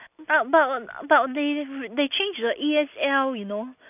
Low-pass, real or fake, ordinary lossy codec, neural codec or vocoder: 3.6 kHz; real; none; none